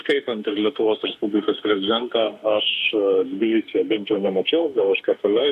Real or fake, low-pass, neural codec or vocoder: fake; 14.4 kHz; autoencoder, 48 kHz, 32 numbers a frame, DAC-VAE, trained on Japanese speech